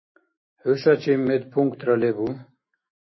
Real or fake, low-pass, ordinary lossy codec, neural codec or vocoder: fake; 7.2 kHz; MP3, 24 kbps; autoencoder, 48 kHz, 128 numbers a frame, DAC-VAE, trained on Japanese speech